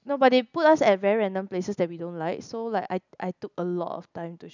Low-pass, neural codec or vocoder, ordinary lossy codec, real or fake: 7.2 kHz; none; none; real